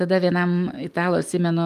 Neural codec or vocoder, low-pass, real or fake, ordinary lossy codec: none; 14.4 kHz; real; Opus, 32 kbps